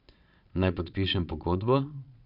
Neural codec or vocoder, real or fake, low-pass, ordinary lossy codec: vocoder, 22.05 kHz, 80 mel bands, Vocos; fake; 5.4 kHz; none